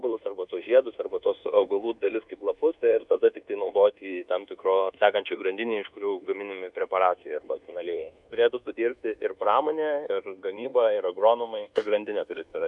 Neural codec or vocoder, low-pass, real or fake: codec, 24 kHz, 1.2 kbps, DualCodec; 10.8 kHz; fake